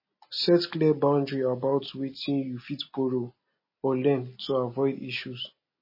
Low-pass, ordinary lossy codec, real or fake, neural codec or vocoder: 5.4 kHz; MP3, 24 kbps; real; none